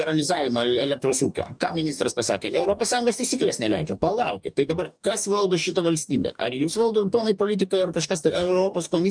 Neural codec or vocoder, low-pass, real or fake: codec, 44.1 kHz, 2.6 kbps, DAC; 9.9 kHz; fake